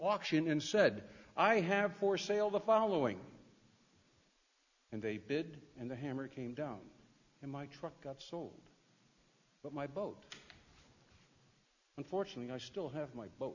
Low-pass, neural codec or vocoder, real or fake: 7.2 kHz; none; real